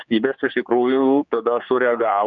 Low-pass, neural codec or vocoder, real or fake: 7.2 kHz; codec, 16 kHz, 4 kbps, X-Codec, HuBERT features, trained on general audio; fake